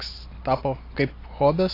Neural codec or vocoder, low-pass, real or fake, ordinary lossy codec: none; 5.4 kHz; real; AAC, 32 kbps